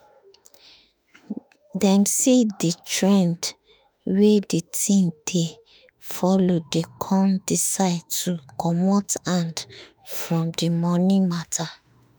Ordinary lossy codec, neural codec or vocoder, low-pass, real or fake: none; autoencoder, 48 kHz, 32 numbers a frame, DAC-VAE, trained on Japanese speech; none; fake